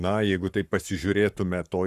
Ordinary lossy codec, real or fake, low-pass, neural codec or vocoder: Opus, 64 kbps; fake; 14.4 kHz; codec, 44.1 kHz, 7.8 kbps, Pupu-Codec